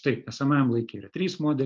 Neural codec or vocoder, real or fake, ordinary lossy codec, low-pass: none; real; Opus, 24 kbps; 7.2 kHz